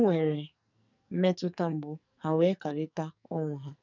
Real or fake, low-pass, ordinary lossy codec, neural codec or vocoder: fake; 7.2 kHz; none; codec, 44.1 kHz, 2.6 kbps, SNAC